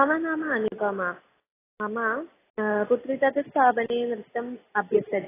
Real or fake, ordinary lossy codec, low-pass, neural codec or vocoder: real; AAC, 16 kbps; 3.6 kHz; none